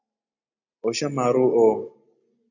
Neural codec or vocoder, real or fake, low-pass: none; real; 7.2 kHz